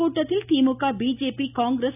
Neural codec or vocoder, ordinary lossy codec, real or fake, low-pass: none; none; real; 3.6 kHz